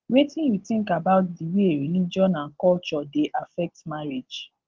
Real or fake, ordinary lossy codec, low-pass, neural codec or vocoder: real; Opus, 16 kbps; 7.2 kHz; none